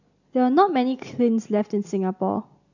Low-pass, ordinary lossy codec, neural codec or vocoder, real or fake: 7.2 kHz; none; none; real